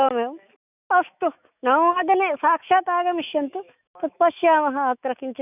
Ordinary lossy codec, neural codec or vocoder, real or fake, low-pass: none; autoencoder, 48 kHz, 128 numbers a frame, DAC-VAE, trained on Japanese speech; fake; 3.6 kHz